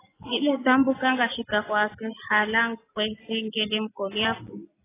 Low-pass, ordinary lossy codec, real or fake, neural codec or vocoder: 3.6 kHz; AAC, 16 kbps; real; none